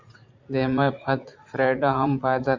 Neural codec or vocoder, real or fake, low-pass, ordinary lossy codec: vocoder, 44.1 kHz, 80 mel bands, Vocos; fake; 7.2 kHz; MP3, 64 kbps